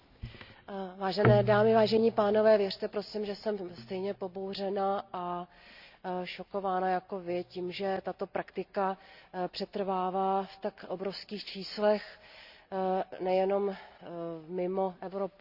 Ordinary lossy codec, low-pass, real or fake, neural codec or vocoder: Opus, 64 kbps; 5.4 kHz; real; none